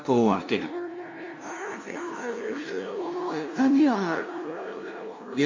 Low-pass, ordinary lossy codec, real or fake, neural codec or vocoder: 7.2 kHz; MP3, 48 kbps; fake; codec, 16 kHz, 0.5 kbps, FunCodec, trained on LibriTTS, 25 frames a second